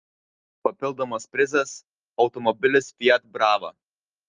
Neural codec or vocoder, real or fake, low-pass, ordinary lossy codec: none; real; 7.2 kHz; Opus, 32 kbps